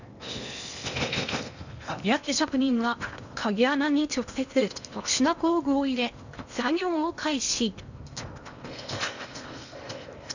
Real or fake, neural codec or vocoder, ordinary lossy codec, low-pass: fake; codec, 16 kHz in and 24 kHz out, 0.8 kbps, FocalCodec, streaming, 65536 codes; none; 7.2 kHz